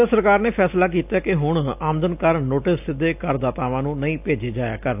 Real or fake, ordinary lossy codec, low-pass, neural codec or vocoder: real; AAC, 32 kbps; 3.6 kHz; none